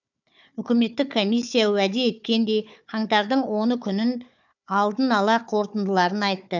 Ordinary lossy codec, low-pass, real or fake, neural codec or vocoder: none; 7.2 kHz; fake; codec, 16 kHz, 4 kbps, FunCodec, trained on Chinese and English, 50 frames a second